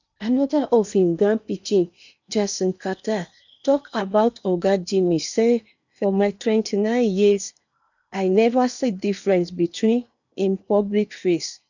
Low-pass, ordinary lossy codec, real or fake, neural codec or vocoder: 7.2 kHz; none; fake; codec, 16 kHz in and 24 kHz out, 0.8 kbps, FocalCodec, streaming, 65536 codes